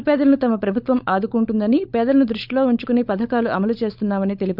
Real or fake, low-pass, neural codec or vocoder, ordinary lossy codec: fake; 5.4 kHz; codec, 16 kHz, 16 kbps, FunCodec, trained on LibriTTS, 50 frames a second; none